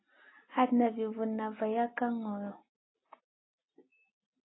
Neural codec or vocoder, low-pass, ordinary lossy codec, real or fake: none; 7.2 kHz; AAC, 16 kbps; real